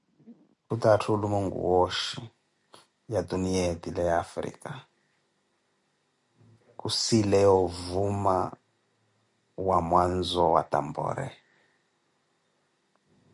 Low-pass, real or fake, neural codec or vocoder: 10.8 kHz; real; none